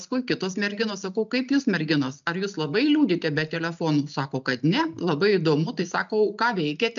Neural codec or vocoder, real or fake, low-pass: none; real; 7.2 kHz